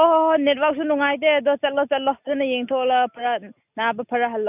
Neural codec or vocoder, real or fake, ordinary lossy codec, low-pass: none; real; none; 3.6 kHz